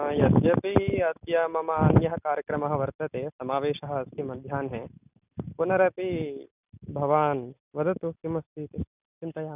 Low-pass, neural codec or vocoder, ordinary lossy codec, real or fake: 3.6 kHz; none; none; real